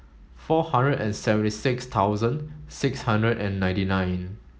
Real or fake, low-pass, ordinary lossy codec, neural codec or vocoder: real; none; none; none